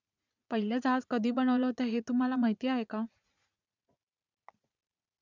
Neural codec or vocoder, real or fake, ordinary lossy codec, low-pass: vocoder, 44.1 kHz, 80 mel bands, Vocos; fake; none; 7.2 kHz